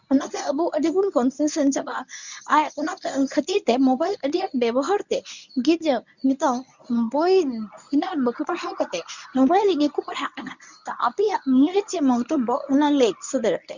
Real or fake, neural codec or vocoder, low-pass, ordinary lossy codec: fake; codec, 24 kHz, 0.9 kbps, WavTokenizer, medium speech release version 1; 7.2 kHz; none